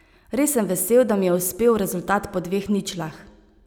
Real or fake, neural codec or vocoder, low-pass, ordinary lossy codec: real; none; none; none